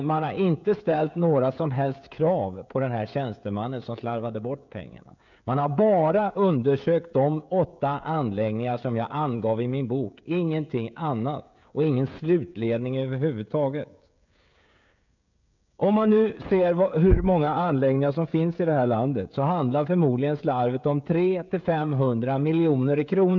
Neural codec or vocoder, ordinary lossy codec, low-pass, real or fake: codec, 16 kHz, 16 kbps, FreqCodec, smaller model; none; 7.2 kHz; fake